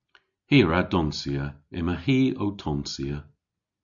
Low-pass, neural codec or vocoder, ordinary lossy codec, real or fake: 7.2 kHz; none; MP3, 96 kbps; real